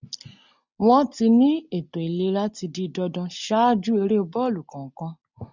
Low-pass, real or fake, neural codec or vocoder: 7.2 kHz; real; none